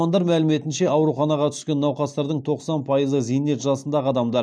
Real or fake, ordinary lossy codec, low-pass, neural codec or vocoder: real; none; none; none